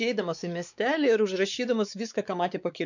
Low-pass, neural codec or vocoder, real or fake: 7.2 kHz; codec, 16 kHz, 4 kbps, X-Codec, WavLM features, trained on Multilingual LibriSpeech; fake